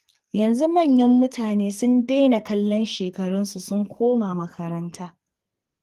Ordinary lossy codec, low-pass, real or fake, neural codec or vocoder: Opus, 24 kbps; 14.4 kHz; fake; codec, 44.1 kHz, 2.6 kbps, SNAC